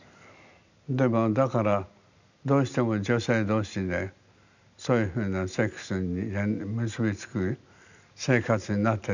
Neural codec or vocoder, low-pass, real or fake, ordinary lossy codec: none; 7.2 kHz; real; none